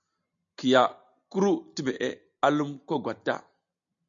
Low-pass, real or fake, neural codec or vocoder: 7.2 kHz; real; none